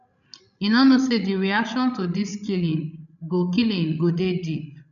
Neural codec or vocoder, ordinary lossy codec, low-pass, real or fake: codec, 16 kHz, 16 kbps, FreqCodec, larger model; none; 7.2 kHz; fake